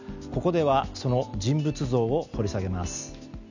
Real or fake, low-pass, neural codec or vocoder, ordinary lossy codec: real; 7.2 kHz; none; none